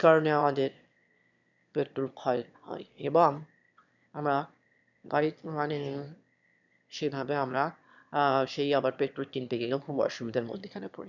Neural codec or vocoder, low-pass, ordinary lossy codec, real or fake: autoencoder, 22.05 kHz, a latent of 192 numbers a frame, VITS, trained on one speaker; 7.2 kHz; none; fake